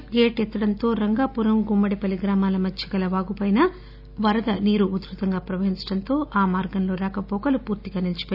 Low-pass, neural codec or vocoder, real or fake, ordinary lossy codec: 5.4 kHz; none; real; none